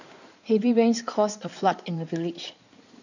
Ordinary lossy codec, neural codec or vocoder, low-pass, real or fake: none; codec, 16 kHz in and 24 kHz out, 2.2 kbps, FireRedTTS-2 codec; 7.2 kHz; fake